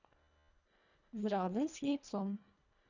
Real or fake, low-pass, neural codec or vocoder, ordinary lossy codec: fake; 7.2 kHz; codec, 24 kHz, 1.5 kbps, HILCodec; none